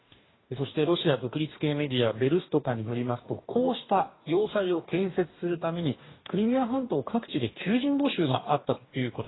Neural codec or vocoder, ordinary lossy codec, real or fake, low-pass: codec, 44.1 kHz, 2.6 kbps, DAC; AAC, 16 kbps; fake; 7.2 kHz